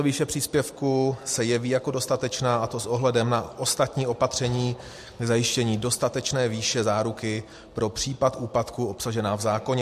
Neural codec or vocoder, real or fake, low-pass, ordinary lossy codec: none; real; 14.4 kHz; MP3, 64 kbps